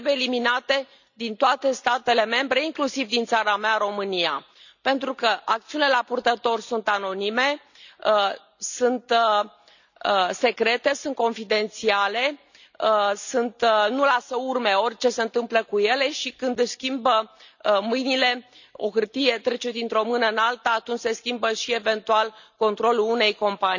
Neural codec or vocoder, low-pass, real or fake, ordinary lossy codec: none; 7.2 kHz; real; none